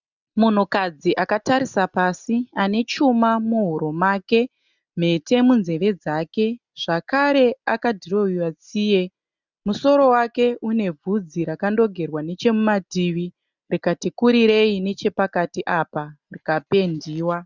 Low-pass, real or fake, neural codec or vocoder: 7.2 kHz; real; none